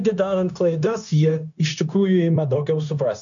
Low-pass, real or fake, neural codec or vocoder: 7.2 kHz; fake; codec, 16 kHz, 0.9 kbps, LongCat-Audio-Codec